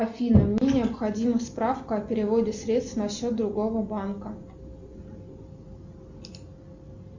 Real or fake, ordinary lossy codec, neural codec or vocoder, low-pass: real; Opus, 64 kbps; none; 7.2 kHz